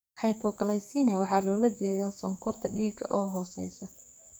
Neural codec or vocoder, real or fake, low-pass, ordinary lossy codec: codec, 44.1 kHz, 2.6 kbps, SNAC; fake; none; none